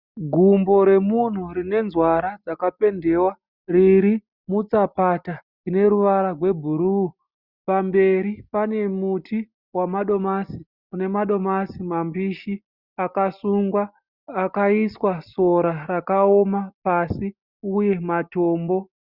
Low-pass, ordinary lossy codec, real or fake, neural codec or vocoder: 5.4 kHz; Opus, 64 kbps; real; none